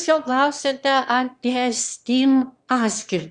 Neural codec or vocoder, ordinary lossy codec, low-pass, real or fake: autoencoder, 22.05 kHz, a latent of 192 numbers a frame, VITS, trained on one speaker; Opus, 64 kbps; 9.9 kHz; fake